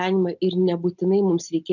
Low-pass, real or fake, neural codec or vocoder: 7.2 kHz; real; none